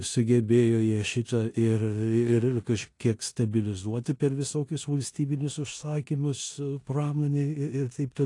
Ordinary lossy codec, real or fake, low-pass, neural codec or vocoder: AAC, 48 kbps; fake; 10.8 kHz; codec, 16 kHz in and 24 kHz out, 0.9 kbps, LongCat-Audio-Codec, four codebook decoder